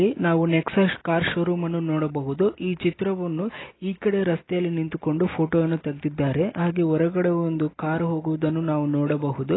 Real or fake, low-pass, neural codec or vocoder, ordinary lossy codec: real; 7.2 kHz; none; AAC, 16 kbps